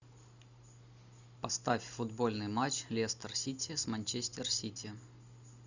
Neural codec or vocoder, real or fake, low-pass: none; real; 7.2 kHz